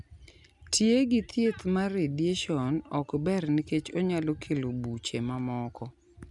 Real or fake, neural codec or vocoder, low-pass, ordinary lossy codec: real; none; 10.8 kHz; none